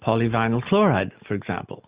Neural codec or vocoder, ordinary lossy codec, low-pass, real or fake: none; Opus, 64 kbps; 3.6 kHz; real